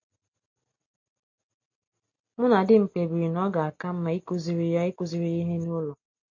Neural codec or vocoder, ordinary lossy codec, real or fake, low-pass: none; MP3, 32 kbps; real; 7.2 kHz